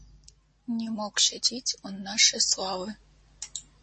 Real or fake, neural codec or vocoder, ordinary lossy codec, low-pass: real; none; MP3, 32 kbps; 10.8 kHz